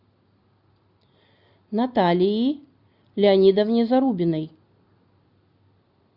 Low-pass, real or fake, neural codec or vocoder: 5.4 kHz; real; none